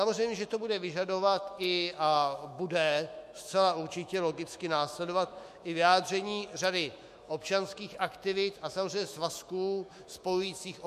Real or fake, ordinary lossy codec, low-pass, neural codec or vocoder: fake; MP3, 64 kbps; 14.4 kHz; autoencoder, 48 kHz, 128 numbers a frame, DAC-VAE, trained on Japanese speech